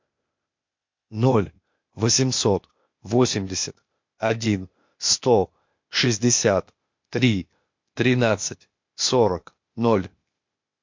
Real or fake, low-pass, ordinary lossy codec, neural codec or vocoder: fake; 7.2 kHz; MP3, 48 kbps; codec, 16 kHz, 0.8 kbps, ZipCodec